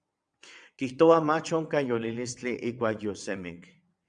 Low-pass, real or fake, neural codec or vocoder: 9.9 kHz; fake; vocoder, 22.05 kHz, 80 mel bands, WaveNeXt